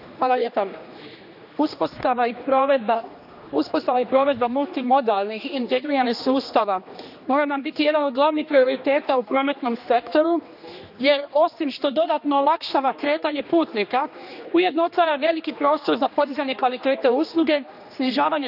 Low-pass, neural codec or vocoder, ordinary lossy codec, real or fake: 5.4 kHz; codec, 16 kHz, 2 kbps, X-Codec, HuBERT features, trained on general audio; none; fake